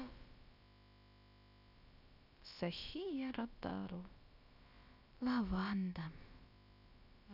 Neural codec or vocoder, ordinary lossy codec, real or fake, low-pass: codec, 16 kHz, about 1 kbps, DyCAST, with the encoder's durations; none; fake; 5.4 kHz